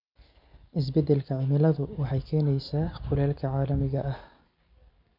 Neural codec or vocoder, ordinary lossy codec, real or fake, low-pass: none; none; real; 5.4 kHz